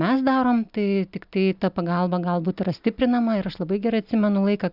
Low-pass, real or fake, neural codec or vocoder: 5.4 kHz; real; none